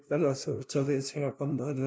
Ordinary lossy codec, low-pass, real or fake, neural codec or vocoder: none; none; fake; codec, 16 kHz, 0.5 kbps, FunCodec, trained on LibriTTS, 25 frames a second